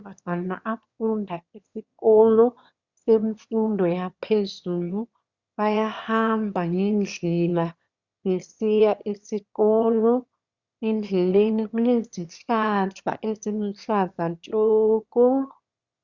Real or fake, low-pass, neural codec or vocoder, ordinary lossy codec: fake; 7.2 kHz; autoencoder, 22.05 kHz, a latent of 192 numbers a frame, VITS, trained on one speaker; Opus, 64 kbps